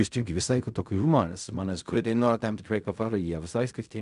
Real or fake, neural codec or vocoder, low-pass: fake; codec, 16 kHz in and 24 kHz out, 0.4 kbps, LongCat-Audio-Codec, fine tuned four codebook decoder; 10.8 kHz